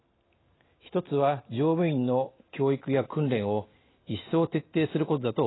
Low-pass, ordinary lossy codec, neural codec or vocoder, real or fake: 7.2 kHz; AAC, 16 kbps; none; real